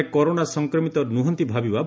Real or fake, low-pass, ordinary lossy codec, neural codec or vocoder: real; none; none; none